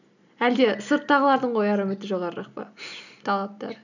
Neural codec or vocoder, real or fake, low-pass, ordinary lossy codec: none; real; 7.2 kHz; none